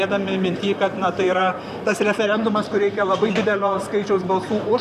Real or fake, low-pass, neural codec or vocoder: fake; 14.4 kHz; vocoder, 44.1 kHz, 128 mel bands, Pupu-Vocoder